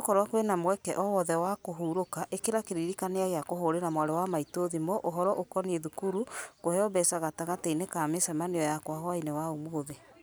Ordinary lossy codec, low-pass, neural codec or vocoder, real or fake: none; none; none; real